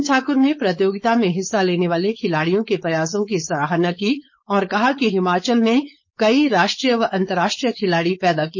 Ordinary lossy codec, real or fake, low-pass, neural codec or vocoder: MP3, 32 kbps; fake; 7.2 kHz; codec, 16 kHz, 4.8 kbps, FACodec